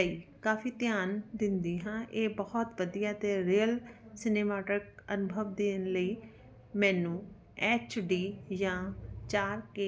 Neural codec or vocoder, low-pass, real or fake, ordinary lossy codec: none; none; real; none